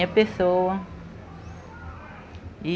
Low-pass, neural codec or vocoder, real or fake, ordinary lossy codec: none; none; real; none